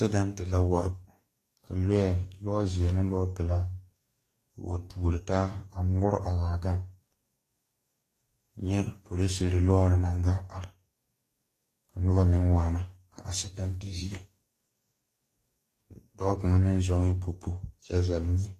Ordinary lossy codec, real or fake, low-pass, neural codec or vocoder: AAC, 48 kbps; fake; 14.4 kHz; codec, 44.1 kHz, 2.6 kbps, DAC